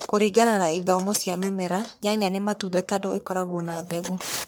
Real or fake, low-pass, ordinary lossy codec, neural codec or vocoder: fake; none; none; codec, 44.1 kHz, 1.7 kbps, Pupu-Codec